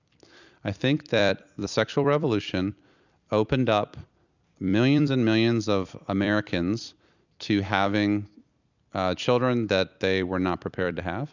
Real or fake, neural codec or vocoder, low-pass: fake; vocoder, 44.1 kHz, 128 mel bands every 256 samples, BigVGAN v2; 7.2 kHz